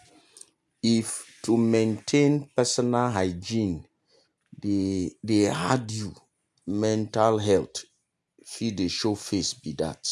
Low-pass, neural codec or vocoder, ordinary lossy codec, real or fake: none; none; none; real